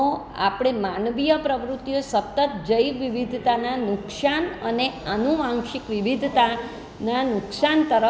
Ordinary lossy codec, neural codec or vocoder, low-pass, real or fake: none; none; none; real